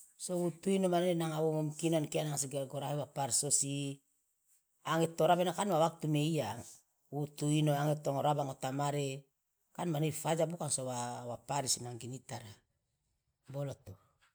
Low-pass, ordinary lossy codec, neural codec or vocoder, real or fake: none; none; none; real